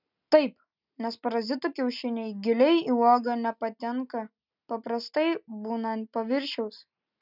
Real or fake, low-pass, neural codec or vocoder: real; 5.4 kHz; none